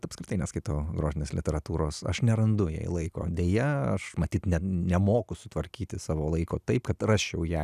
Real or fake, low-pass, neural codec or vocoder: real; 14.4 kHz; none